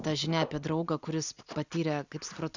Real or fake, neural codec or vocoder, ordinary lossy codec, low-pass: real; none; Opus, 64 kbps; 7.2 kHz